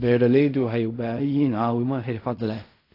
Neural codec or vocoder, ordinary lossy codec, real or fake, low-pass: codec, 16 kHz in and 24 kHz out, 0.4 kbps, LongCat-Audio-Codec, fine tuned four codebook decoder; AAC, 32 kbps; fake; 5.4 kHz